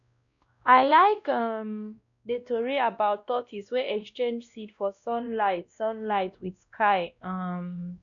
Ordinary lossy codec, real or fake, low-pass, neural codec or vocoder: none; fake; 7.2 kHz; codec, 16 kHz, 1 kbps, X-Codec, WavLM features, trained on Multilingual LibriSpeech